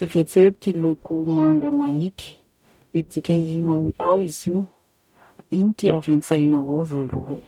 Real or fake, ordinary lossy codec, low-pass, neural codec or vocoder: fake; none; 19.8 kHz; codec, 44.1 kHz, 0.9 kbps, DAC